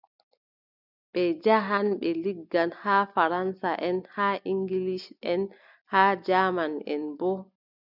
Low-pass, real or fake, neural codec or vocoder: 5.4 kHz; real; none